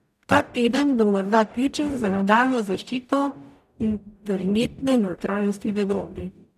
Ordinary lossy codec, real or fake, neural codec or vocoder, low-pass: none; fake; codec, 44.1 kHz, 0.9 kbps, DAC; 14.4 kHz